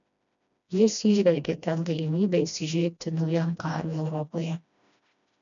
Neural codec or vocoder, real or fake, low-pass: codec, 16 kHz, 1 kbps, FreqCodec, smaller model; fake; 7.2 kHz